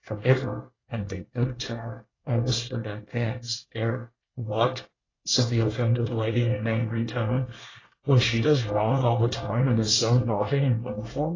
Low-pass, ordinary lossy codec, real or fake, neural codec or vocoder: 7.2 kHz; AAC, 32 kbps; fake; codec, 24 kHz, 1 kbps, SNAC